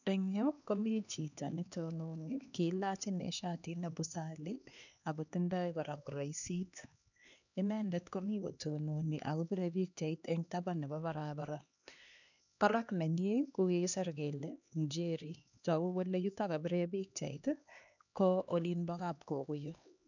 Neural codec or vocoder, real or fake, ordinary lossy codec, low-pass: codec, 16 kHz, 2 kbps, X-Codec, HuBERT features, trained on LibriSpeech; fake; none; 7.2 kHz